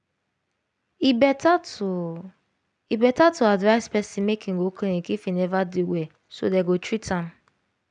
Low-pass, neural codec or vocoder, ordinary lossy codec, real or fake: 9.9 kHz; none; none; real